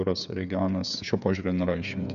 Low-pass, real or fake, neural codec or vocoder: 7.2 kHz; fake; codec, 16 kHz, 8 kbps, FreqCodec, smaller model